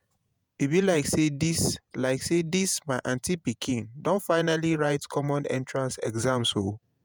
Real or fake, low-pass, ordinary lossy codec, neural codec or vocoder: fake; none; none; vocoder, 48 kHz, 128 mel bands, Vocos